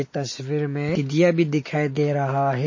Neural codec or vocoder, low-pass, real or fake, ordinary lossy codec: none; 7.2 kHz; real; MP3, 32 kbps